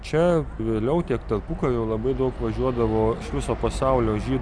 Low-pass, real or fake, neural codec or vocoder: 9.9 kHz; real; none